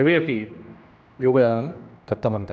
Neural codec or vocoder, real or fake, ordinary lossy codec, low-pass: codec, 16 kHz, 1 kbps, X-Codec, HuBERT features, trained on general audio; fake; none; none